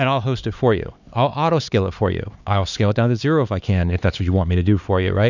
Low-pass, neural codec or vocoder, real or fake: 7.2 kHz; codec, 16 kHz, 4 kbps, X-Codec, HuBERT features, trained on LibriSpeech; fake